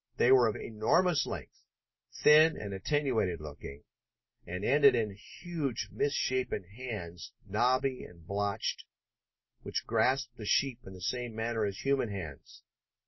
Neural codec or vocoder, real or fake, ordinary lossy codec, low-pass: none; real; MP3, 24 kbps; 7.2 kHz